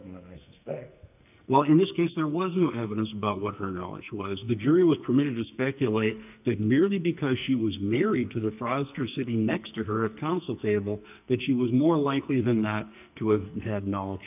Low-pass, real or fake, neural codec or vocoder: 3.6 kHz; fake; codec, 44.1 kHz, 2.6 kbps, SNAC